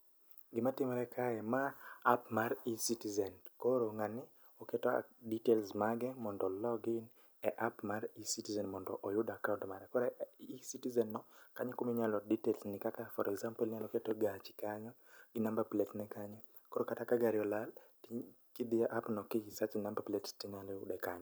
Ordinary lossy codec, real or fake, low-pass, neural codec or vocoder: none; real; none; none